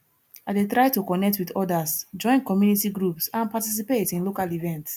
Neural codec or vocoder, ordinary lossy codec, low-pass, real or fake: none; none; none; real